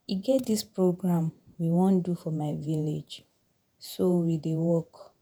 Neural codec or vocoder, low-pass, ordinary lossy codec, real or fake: vocoder, 48 kHz, 128 mel bands, Vocos; none; none; fake